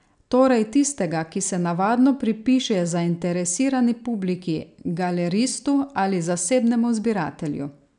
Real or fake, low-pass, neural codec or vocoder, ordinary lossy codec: real; 9.9 kHz; none; none